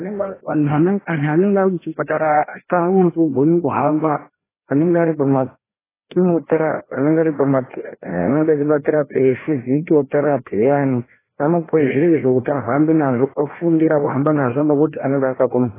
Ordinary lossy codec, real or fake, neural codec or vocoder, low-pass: AAC, 16 kbps; fake; codec, 16 kHz, 1 kbps, FreqCodec, larger model; 3.6 kHz